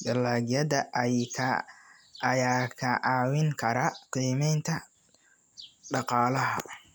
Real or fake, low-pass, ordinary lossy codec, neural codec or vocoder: real; none; none; none